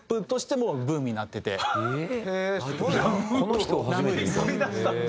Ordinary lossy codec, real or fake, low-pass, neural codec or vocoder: none; real; none; none